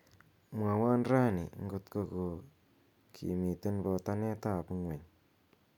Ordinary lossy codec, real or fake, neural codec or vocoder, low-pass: none; real; none; 19.8 kHz